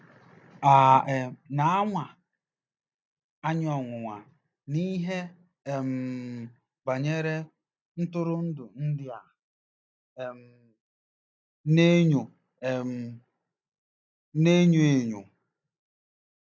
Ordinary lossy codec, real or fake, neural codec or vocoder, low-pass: none; real; none; none